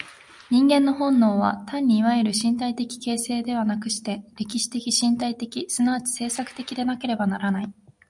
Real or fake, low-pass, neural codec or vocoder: real; 10.8 kHz; none